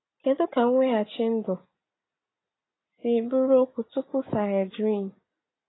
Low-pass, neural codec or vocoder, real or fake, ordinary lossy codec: 7.2 kHz; none; real; AAC, 16 kbps